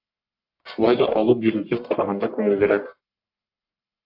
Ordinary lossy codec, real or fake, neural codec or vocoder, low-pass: AAC, 48 kbps; fake; codec, 44.1 kHz, 1.7 kbps, Pupu-Codec; 5.4 kHz